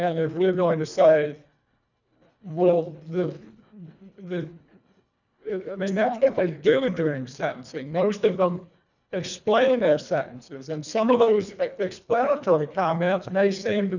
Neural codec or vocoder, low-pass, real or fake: codec, 24 kHz, 1.5 kbps, HILCodec; 7.2 kHz; fake